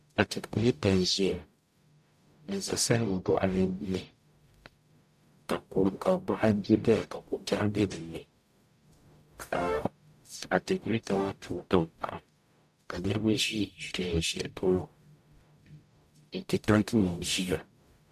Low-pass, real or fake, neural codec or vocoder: 14.4 kHz; fake; codec, 44.1 kHz, 0.9 kbps, DAC